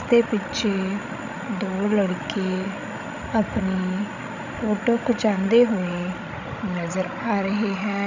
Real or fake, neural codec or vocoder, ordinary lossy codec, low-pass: fake; codec, 16 kHz, 16 kbps, FreqCodec, larger model; none; 7.2 kHz